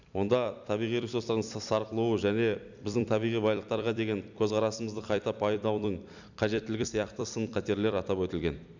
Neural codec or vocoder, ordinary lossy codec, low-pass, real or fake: vocoder, 44.1 kHz, 128 mel bands every 256 samples, BigVGAN v2; none; 7.2 kHz; fake